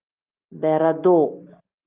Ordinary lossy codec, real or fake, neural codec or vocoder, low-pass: Opus, 24 kbps; real; none; 3.6 kHz